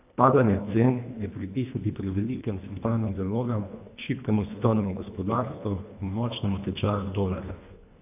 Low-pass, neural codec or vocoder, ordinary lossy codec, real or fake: 3.6 kHz; codec, 24 kHz, 1.5 kbps, HILCodec; AAC, 32 kbps; fake